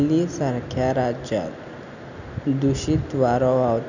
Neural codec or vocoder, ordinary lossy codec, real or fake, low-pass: none; none; real; 7.2 kHz